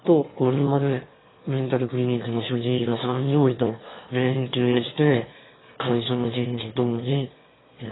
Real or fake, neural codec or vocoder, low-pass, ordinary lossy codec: fake; autoencoder, 22.05 kHz, a latent of 192 numbers a frame, VITS, trained on one speaker; 7.2 kHz; AAC, 16 kbps